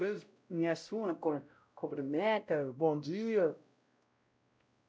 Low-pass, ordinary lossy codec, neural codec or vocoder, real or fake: none; none; codec, 16 kHz, 0.5 kbps, X-Codec, WavLM features, trained on Multilingual LibriSpeech; fake